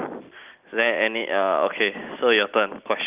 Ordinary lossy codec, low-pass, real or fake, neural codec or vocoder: Opus, 32 kbps; 3.6 kHz; real; none